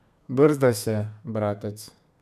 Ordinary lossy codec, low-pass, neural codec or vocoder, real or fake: none; 14.4 kHz; codec, 32 kHz, 1.9 kbps, SNAC; fake